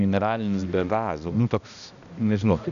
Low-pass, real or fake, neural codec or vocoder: 7.2 kHz; fake; codec, 16 kHz, 1 kbps, X-Codec, HuBERT features, trained on balanced general audio